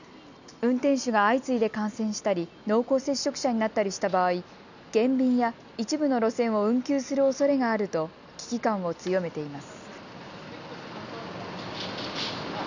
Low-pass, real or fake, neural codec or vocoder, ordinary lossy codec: 7.2 kHz; real; none; none